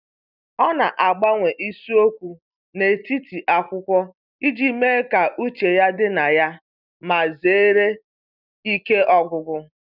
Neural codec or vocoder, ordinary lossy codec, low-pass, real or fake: none; none; 5.4 kHz; real